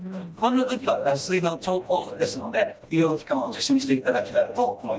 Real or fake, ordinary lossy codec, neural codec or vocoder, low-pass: fake; none; codec, 16 kHz, 1 kbps, FreqCodec, smaller model; none